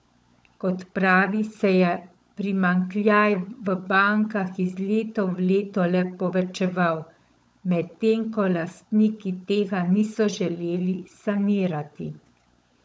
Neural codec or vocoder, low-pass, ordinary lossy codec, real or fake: codec, 16 kHz, 16 kbps, FunCodec, trained on LibriTTS, 50 frames a second; none; none; fake